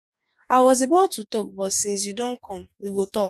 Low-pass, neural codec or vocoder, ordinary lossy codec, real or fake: 14.4 kHz; codec, 44.1 kHz, 2.6 kbps, DAC; none; fake